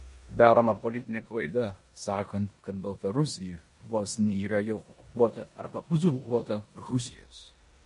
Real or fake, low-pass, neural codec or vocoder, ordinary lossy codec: fake; 10.8 kHz; codec, 16 kHz in and 24 kHz out, 0.9 kbps, LongCat-Audio-Codec, four codebook decoder; MP3, 48 kbps